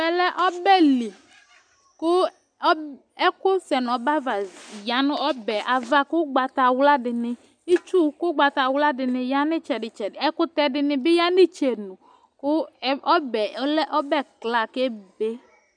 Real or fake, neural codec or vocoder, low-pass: real; none; 9.9 kHz